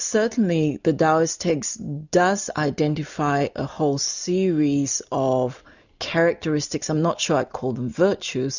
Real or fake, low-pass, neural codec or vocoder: real; 7.2 kHz; none